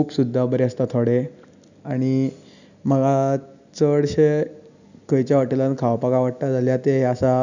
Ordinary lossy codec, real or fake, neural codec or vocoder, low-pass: none; real; none; 7.2 kHz